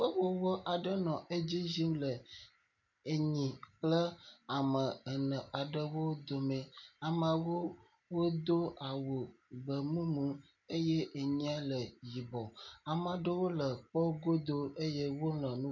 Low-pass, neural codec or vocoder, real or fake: 7.2 kHz; none; real